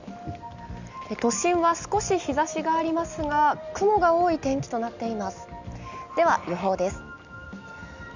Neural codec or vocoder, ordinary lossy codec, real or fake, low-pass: none; none; real; 7.2 kHz